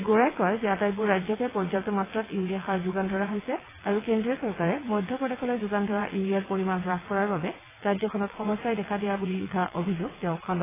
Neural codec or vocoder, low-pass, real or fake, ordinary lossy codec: vocoder, 22.05 kHz, 80 mel bands, WaveNeXt; 3.6 kHz; fake; AAC, 16 kbps